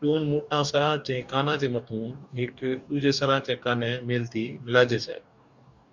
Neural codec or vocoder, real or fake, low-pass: codec, 44.1 kHz, 2.6 kbps, DAC; fake; 7.2 kHz